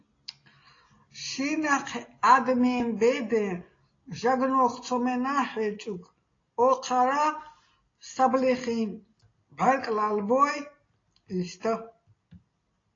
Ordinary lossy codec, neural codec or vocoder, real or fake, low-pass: AAC, 32 kbps; none; real; 7.2 kHz